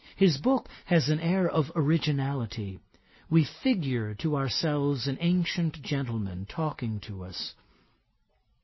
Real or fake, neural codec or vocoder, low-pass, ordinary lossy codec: real; none; 7.2 kHz; MP3, 24 kbps